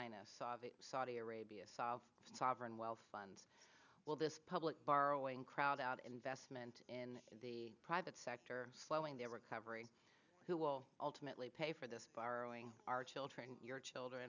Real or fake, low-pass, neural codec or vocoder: real; 7.2 kHz; none